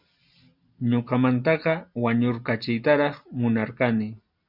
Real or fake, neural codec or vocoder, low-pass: real; none; 5.4 kHz